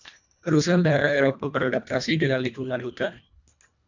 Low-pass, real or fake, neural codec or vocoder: 7.2 kHz; fake; codec, 24 kHz, 1.5 kbps, HILCodec